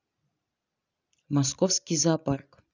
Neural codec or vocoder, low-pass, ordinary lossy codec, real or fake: none; 7.2 kHz; none; real